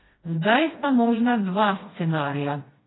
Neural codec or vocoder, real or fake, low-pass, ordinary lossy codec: codec, 16 kHz, 1 kbps, FreqCodec, smaller model; fake; 7.2 kHz; AAC, 16 kbps